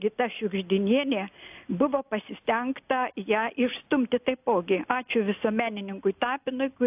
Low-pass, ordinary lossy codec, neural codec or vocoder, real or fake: 3.6 kHz; AAC, 32 kbps; none; real